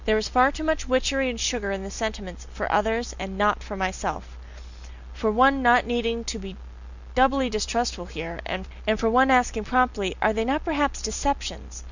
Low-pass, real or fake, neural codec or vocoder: 7.2 kHz; real; none